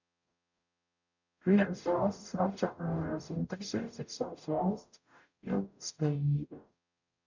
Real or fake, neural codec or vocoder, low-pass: fake; codec, 44.1 kHz, 0.9 kbps, DAC; 7.2 kHz